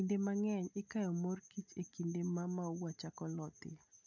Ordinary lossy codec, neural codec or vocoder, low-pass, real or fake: none; none; 7.2 kHz; real